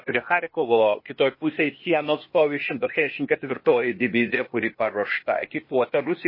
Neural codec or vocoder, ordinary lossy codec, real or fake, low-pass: codec, 16 kHz, 0.8 kbps, ZipCodec; MP3, 24 kbps; fake; 5.4 kHz